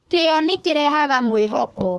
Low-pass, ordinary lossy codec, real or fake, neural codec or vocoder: none; none; fake; codec, 24 kHz, 1 kbps, SNAC